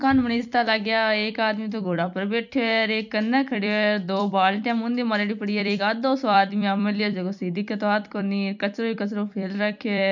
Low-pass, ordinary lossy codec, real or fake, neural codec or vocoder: 7.2 kHz; none; fake; vocoder, 44.1 kHz, 128 mel bands every 256 samples, BigVGAN v2